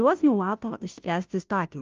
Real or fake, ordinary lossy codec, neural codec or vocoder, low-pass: fake; Opus, 24 kbps; codec, 16 kHz, 0.5 kbps, FunCodec, trained on Chinese and English, 25 frames a second; 7.2 kHz